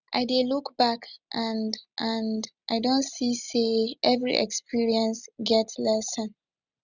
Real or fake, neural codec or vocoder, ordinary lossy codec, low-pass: real; none; none; 7.2 kHz